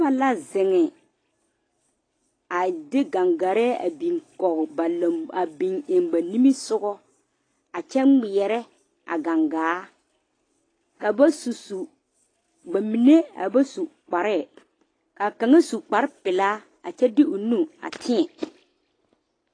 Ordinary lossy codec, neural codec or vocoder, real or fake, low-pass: AAC, 32 kbps; none; real; 9.9 kHz